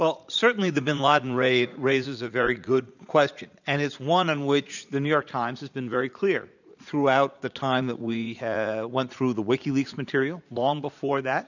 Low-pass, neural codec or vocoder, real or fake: 7.2 kHz; vocoder, 44.1 kHz, 80 mel bands, Vocos; fake